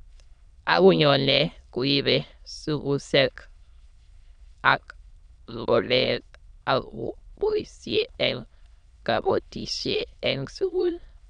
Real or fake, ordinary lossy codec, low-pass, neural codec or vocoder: fake; none; 9.9 kHz; autoencoder, 22.05 kHz, a latent of 192 numbers a frame, VITS, trained on many speakers